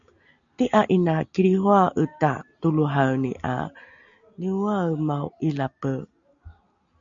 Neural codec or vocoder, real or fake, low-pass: none; real; 7.2 kHz